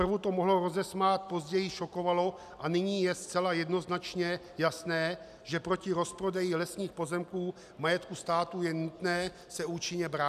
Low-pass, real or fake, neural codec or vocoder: 14.4 kHz; real; none